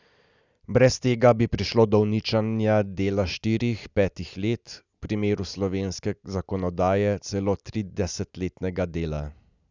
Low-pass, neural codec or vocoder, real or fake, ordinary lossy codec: 7.2 kHz; none; real; none